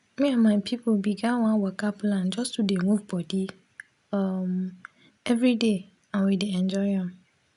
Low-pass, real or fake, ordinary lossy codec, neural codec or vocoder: 10.8 kHz; real; none; none